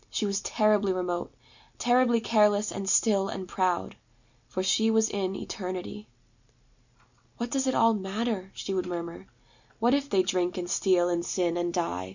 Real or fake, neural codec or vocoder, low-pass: real; none; 7.2 kHz